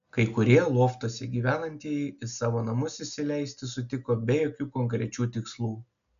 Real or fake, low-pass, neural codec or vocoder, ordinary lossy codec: real; 7.2 kHz; none; MP3, 96 kbps